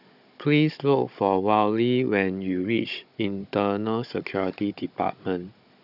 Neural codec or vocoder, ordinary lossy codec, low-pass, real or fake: codec, 16 kHz, 16 kbps, FunCodec, trained on Chinese and English, 50 frames a second; none; 5.4 kHz; fake